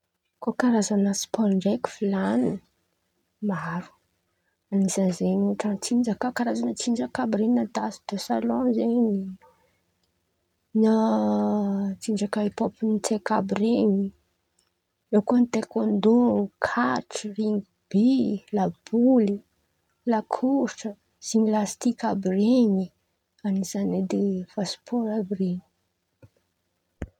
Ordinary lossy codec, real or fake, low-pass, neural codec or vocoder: none; real; 19.8 kHz; none